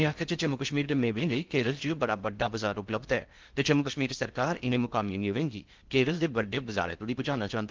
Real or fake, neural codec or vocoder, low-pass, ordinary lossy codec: fake; codec, 16 kHz in and 24 kHz out, 0.6 kbps, FocalCodec, streaming, 2048 codes; 7.2 kHz; Opus, 32 kbps